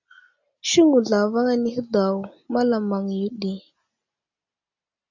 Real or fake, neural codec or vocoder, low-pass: real; none; 7.2 kHz